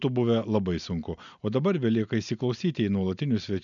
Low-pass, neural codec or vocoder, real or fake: 7.2 kHz; none; real